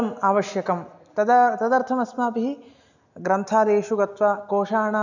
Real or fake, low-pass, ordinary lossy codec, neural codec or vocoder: real; 7.2 kHz; none; none